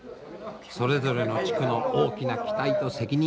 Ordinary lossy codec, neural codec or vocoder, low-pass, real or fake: none; none; none; real